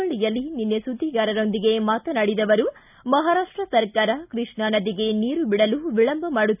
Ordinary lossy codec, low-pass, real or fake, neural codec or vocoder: none; 3.6 kHz; real; none